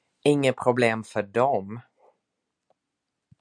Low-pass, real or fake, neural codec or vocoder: 9.9 kHz; real; none